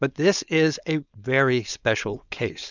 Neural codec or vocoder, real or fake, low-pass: codec, 16 kHz, 4.8 kbps, FACodec; fake; 7.2 kHz